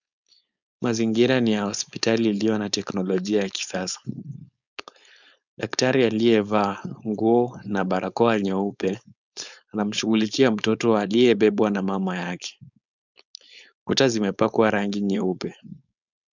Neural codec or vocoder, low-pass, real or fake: codec, 16 kHz, 4.8 kbps, FACodec; 7.2 kHz; fake